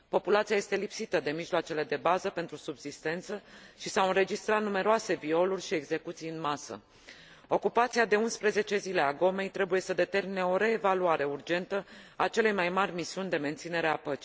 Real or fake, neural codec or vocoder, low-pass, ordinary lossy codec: real; none; none; none